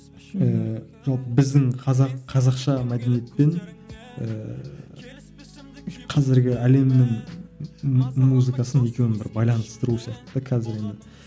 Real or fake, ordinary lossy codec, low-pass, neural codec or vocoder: real; none; none; none